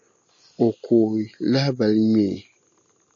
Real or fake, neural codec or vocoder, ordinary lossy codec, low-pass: real; none; AAC, 48 kbps; 7.2 kHz